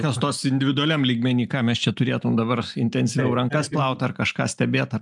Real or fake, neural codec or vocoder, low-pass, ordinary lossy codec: real; none; 10.8 kHz; MP3, 96 kbps